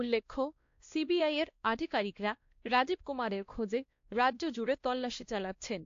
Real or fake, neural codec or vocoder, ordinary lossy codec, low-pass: fake; codec, 16 kHz, 1 kbps, X-Codec, WavLM features, trained on Multilingual LibriSpeech; AAC, 48 kbps; 7.2 kHz